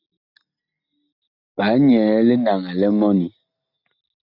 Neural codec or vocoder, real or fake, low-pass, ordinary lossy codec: none; real; 5.4 kHz; AAC, 48 kbps